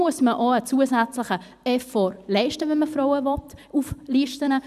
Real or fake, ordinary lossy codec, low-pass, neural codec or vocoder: fake; none; 14.4 kHz; vocoder, 44.1 kHz, 128 mel bands every 256 samples, BigVGAN v2